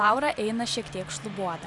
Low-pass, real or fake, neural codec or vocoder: 10.8 kHz; fake; vocoder, 44.1 kHz, 128 mel bands every 512 samples, BigVGAN v2